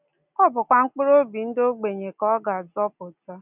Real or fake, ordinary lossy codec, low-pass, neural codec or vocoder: real; none; 3.6 kHz; none